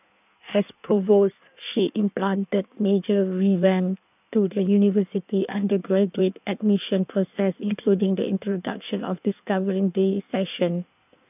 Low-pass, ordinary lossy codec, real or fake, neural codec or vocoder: 3.6 kHz; none; fake; codec, 16 kHz in and 24 kHz out, 1.1 kbps, FireRedTTS-2 codec